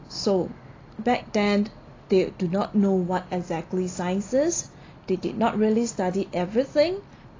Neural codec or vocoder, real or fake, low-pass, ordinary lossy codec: none; real; 7.2 kHz; AAC, 32 kbps